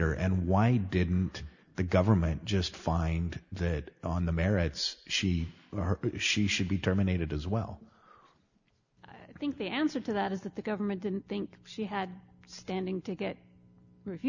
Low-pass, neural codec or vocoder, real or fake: 7.2 kHz; none; real